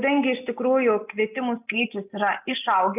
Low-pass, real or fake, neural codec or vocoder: 3.6 kHz; real; none